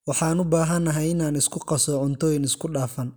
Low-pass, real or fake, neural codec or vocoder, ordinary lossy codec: none; real; none; none